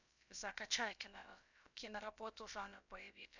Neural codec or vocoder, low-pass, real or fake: codec, 16 kHz, about 1 kbps, DyCAST, with the encoder's durations; 7.2 kHz; fake